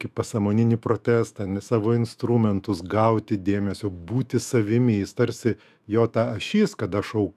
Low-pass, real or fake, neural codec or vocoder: 14.4 kHz; fake; autoencoder, 48 kHz, 128 numbers a frame, DAC-VAE, trained on Japanese speech